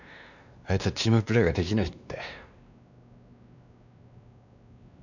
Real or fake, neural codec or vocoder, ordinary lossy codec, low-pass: fake; codec, 16 kHz, 2 kbps, X-Codec, WavLM features, trained on Multilingual LibriSpeech; none; 7.2 kHz